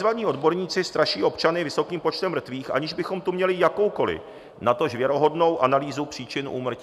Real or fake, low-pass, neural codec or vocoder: real; 14.4 kHz; none